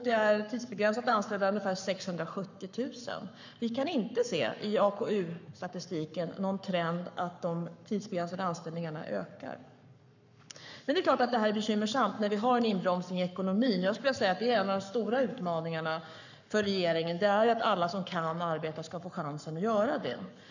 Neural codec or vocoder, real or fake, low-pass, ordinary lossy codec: codec, 44.1 kHz, 7.8 kbps, Pupu-Codec; fake; 7.2 kHz; none